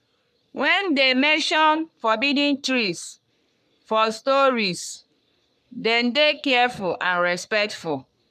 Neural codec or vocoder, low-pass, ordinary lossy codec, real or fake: codec, 44.1 kHz, 3.4 kbps, Pupu-Codec; 14.4 kHz; none; fake